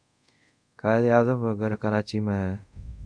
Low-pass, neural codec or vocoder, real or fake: 9.9 kHz; codec, 24 kHz, 0.5 kbps, DualCodec; fake